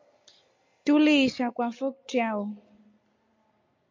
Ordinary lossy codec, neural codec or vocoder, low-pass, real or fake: MP3, 64 kbps; none; 7.2 kHz; real